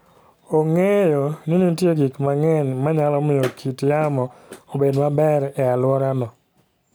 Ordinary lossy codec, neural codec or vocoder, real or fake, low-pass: none; none; real; none